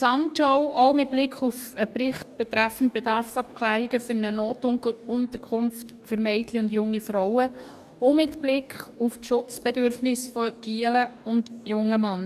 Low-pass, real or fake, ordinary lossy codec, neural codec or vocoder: 14.4 kHz; fake; none; codec, 44.1 kHz, 2.6 kbps, DAC